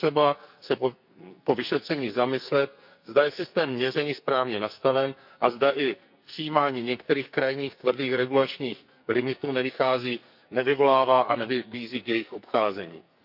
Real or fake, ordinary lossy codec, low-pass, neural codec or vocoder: fake; none; 5.4 kHz; codec, 44.1 kHz, 2.6 kbps, SNAC